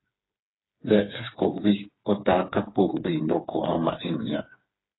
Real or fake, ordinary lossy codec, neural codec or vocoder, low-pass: fake; AAC, 16 kbps; codec, 16 kHz, 4 kbps, FreqCodec, smaller model; 7.2 kHz